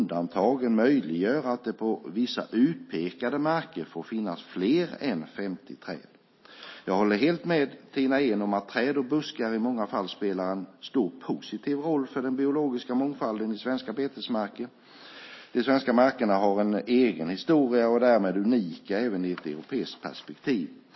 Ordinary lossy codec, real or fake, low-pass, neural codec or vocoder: MP3, 24 kbps; real; 7.2 kHz; none